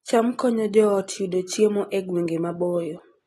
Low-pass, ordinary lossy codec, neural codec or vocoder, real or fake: 19.8 kHz; AAC, 32 kbps; none; real